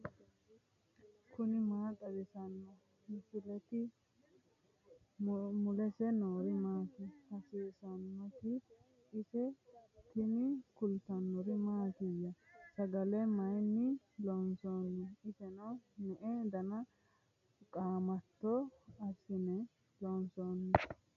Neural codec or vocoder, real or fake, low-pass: none; real; 7.2 kHz